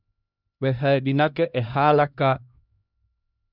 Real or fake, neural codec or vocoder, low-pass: fake; codec, 16 kHz, 1 kbps, X-Codec, HuBERT features, trained on LibriSpeech; 5.4 kHz